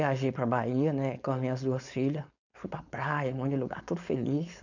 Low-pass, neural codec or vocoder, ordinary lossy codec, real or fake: 7.2 kHz; codec, 16 kHz, 4.8 kbps, FACodec; none; fake